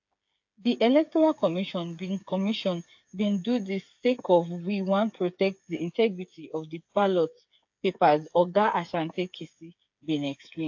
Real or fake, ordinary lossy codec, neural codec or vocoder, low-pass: fake; AAC, 48 kbps; codec, 16 kHz, 8 kbps, FreqCodec, smaller model; 7.2 kHz